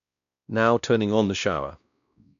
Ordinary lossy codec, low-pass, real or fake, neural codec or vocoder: none; 7.2 kHz; fake; codec, 16 kHz, 1 kbps, X-Codec, WavLM features, trained on Multilingual LibriSpeech